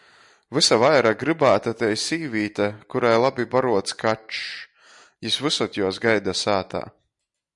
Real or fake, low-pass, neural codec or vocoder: real; 10.8 kHz; none